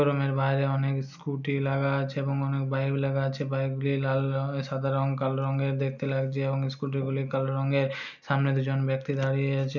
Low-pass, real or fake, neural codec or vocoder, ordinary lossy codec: 7.2 kHz; real; none; none